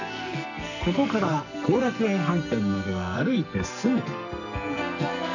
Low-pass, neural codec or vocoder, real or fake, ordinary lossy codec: 7.2 kHz; codec, 44.1 kHz, 2.6 kbps, SNAC; fake; none